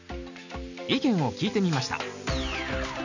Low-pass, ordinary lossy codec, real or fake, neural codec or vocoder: 7.2 kHz; none; real; none